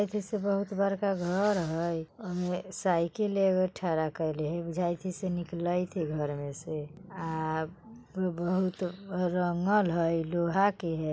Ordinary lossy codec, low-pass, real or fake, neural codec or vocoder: none; none; real; none